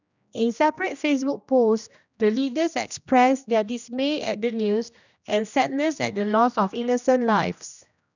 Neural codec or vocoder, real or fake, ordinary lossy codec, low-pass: codec, 16 kHz, 1 kbps, X-Codec, HuBERT features, trained on general audio; fake; none; 7.2 kHz